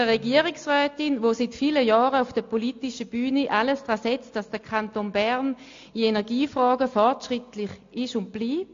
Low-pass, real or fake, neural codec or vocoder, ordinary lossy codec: 7.2 kHz; real; none; AAC, 48 kbps